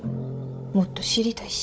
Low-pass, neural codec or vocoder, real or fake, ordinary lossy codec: none; codec, 16 kHz, 16 kbps, FunCodec, trained on Chinese and English, 50 frames a second; fake; none